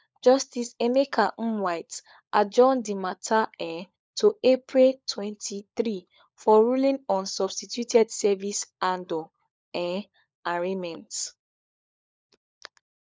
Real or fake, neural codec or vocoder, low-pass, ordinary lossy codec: fake; codec, 16 kHz, 16 kbps, FunCodec, trained on LibriTTS, 50 frames a second; none; none